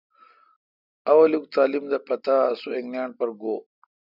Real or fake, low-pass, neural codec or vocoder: real; 5.4 kHz; none